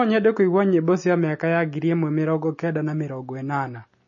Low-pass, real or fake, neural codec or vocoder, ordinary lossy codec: 7.2 kHz; real; none; MP3, 32 kbps